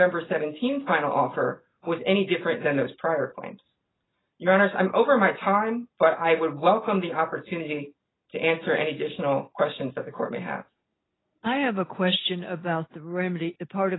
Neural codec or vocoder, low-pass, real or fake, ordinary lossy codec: none; 7.2 kHz; real; AAC, 16 kbps